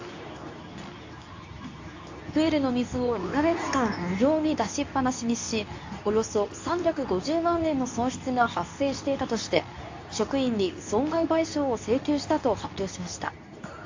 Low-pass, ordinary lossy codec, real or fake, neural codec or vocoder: 7.2 kHz; AAC, 48 kbps; fake; codec, 24 kHz, 0.9 kbps, WavTokenizer, medium speech release version 2